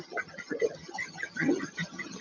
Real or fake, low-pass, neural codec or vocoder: fake; 7.2 kHz; vocoder, 22.05 kHz, 80 mel bands, HiFi-GAN